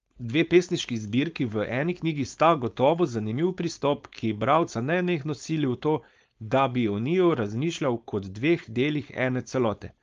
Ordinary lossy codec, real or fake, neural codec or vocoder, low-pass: Opus, 32 kbps; fake; codec, 16 kHz, 4.8 kbps, FACodec; 7.2 kHz